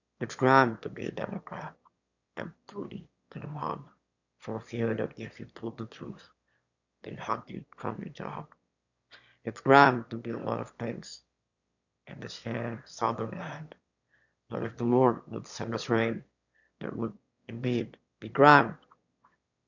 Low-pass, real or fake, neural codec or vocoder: 7.2 kHz; fake; autoencoder, 22.05 kHz, a latent of 192 numbers a frame, VITS, trained on one speaker